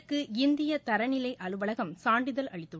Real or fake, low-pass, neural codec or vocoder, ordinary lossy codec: real; none; none; none